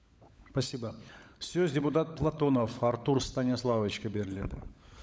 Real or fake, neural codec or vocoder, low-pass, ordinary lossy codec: fake; codec, 16 kHz, 16 kbps, FunCodec, trained on LibriTTS, 50 frames a second; none; none